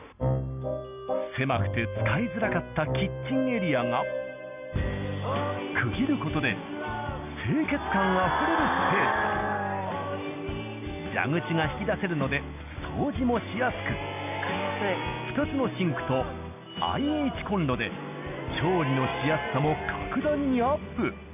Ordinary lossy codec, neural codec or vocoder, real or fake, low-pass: none; none; real; 3.6 kHz